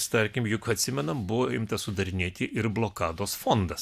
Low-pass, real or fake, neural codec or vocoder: 14.4 kHz; real; none